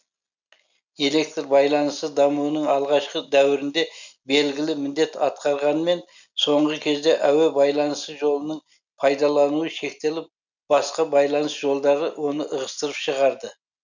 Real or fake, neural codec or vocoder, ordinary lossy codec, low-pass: real; none; none; 7.2 kHz